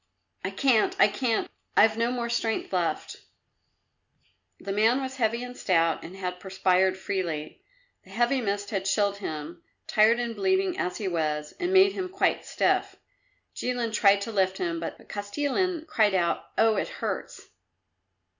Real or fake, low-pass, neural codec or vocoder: real; 7.2 kHz; none